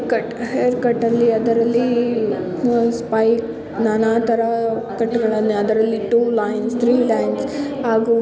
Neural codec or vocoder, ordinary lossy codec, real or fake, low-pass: none; none; real; none